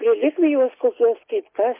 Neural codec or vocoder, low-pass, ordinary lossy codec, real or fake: none; 3.6 kHz; MP3, 24 kbps; real